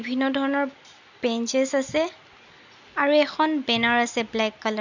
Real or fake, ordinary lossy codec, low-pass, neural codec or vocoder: real; none; 7.2 kHz; none